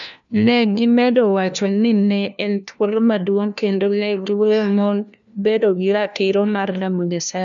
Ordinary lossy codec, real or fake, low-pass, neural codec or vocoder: none; fake; 7.2 kHz; codec, 16 kHz, 1 kbps, FunCodec, trained on LibriTTS, 50 frames a second